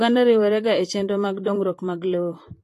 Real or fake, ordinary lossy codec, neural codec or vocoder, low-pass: fake; AAC, 48 kbps; vocoder, 44.1 kHz, 128 mel bands, Pupu-Vocoder; 14.4 kHz